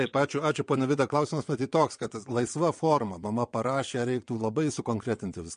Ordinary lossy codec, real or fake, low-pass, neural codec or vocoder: MP3, 48 kbps; fake; 9.9 kHz; vocoder, 22.05 kHz, 80 mel bands, WaveNeXt